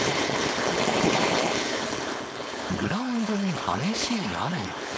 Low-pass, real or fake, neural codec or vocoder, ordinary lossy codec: none; fake; codec, 16 kHz, 4.8 kbps, FACodec; none